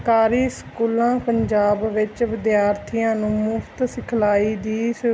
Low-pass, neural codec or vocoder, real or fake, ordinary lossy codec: none; none; real; none